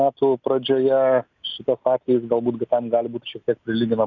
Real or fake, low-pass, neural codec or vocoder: real; 7.2 kHz; none